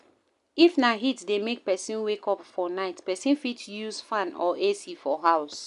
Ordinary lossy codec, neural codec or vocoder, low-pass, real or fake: none; none; 10.8 kHz; real